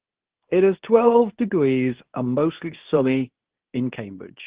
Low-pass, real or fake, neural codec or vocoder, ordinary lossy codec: 3.6 kHz; fake; codec, 24 kHz, 0.9 kbps, WavTokenizer, medium speech release version 2; Opus, 16 kbps